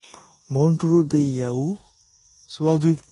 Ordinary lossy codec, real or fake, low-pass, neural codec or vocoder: AAC, 32 kbps; fake; 10.8 kHz; codec, 16 kHz in and 24 kHz out, 0.9 kbps, LongCat-Audio-Codec, four codebook decoder